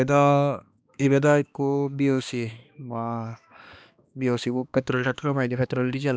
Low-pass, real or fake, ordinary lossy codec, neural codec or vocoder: none; fake; none; codec, 16 kHz, 2 kbps, X-Codec, HuBERT features, trained on balanced general audio